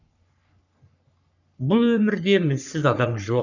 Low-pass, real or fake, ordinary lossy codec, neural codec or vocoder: 7.2 kHz; fake; none; codec, 44.1 kHz, 3.4 kbps, Pupu-Codec